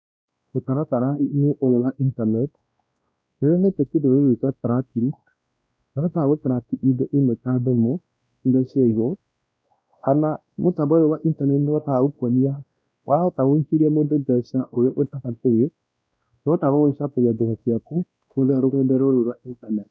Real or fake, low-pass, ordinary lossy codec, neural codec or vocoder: fake; none; none; codec, 16 kHz, 1 kbps, X-Codec, WavLM features, trained on Multilingual LibriSpeech